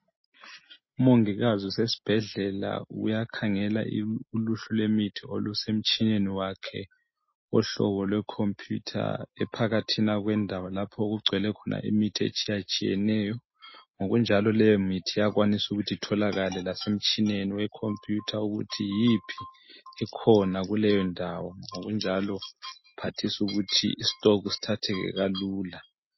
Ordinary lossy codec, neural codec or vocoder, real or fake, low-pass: MP3, 24 kbps; none; real; 7.2 kHz